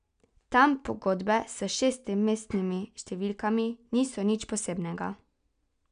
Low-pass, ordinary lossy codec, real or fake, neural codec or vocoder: 9.9 kHz; none; real; none